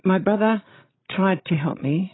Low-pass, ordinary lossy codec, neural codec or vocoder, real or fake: 7.2 kHz; AAC, 16 kbps; codec, 16 kHz, 16 kbps, FreqCodec, larger model; fake